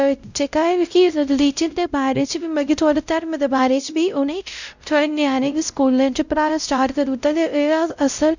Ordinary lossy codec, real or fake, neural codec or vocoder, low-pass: none; fake; codec, 16 kHz, 0.5 kbps, X-Codec, WavLM features, trained on Multilingual LibriSpeech; 7.2 kHz